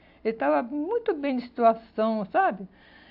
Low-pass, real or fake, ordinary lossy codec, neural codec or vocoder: 5.4 kHz; real; AAC, 48 kbps; none